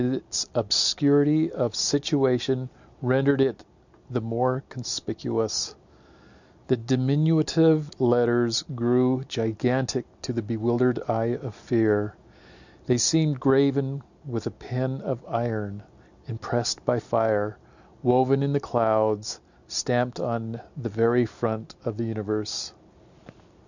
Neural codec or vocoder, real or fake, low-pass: none; real; 7.2 kHz